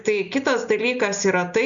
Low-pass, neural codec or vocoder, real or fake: 7.2 kHz; none; real